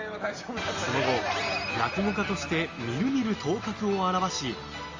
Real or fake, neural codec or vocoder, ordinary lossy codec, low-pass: real; none; Opus, 32 kbps; 7.2 kHz